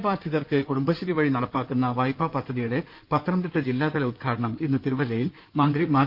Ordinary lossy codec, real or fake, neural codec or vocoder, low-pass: Opus, 32 kbps; fake; codec, 16 kHz in and 24 kHz out, 2.2 kbps, FireRedTTS-2 codec; 5.4 kHz